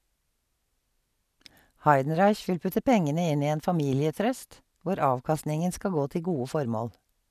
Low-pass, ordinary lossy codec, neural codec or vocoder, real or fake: 14.4 kHz; none; none; real